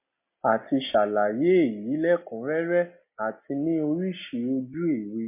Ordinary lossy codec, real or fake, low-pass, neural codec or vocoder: MP3, 24 kbps; real; 3.6 kHz; none